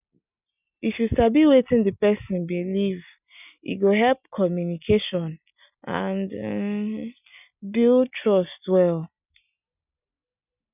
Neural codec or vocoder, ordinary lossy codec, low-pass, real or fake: none; none; 3.6 kHz; real